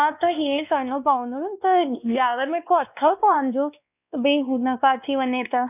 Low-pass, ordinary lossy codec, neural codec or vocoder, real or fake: 3.6 kHz; none; codec, 16 kHz, 2 kbps, X-Codec, WavLM features, trained on Multilingual LibriSpeech; fake